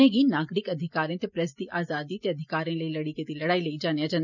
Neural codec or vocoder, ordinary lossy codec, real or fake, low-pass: none; none; real; 7.2 kHz